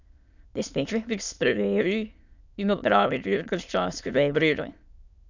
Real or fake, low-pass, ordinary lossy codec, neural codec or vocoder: fake; 7.2 kHz; none; autoencoder, 22.05 kHz, a latent of 192 numbers a frame, VITS, trained on many speakers